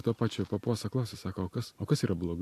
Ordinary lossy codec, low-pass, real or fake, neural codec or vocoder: AAC, 64 kbps; 14.4 kHz; real; none